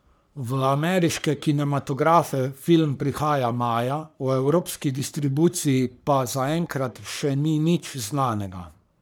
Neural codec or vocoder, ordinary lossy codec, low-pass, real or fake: codec, 44.1 kHz, 3.4 kbps, Pupu-Codec; none; none; fake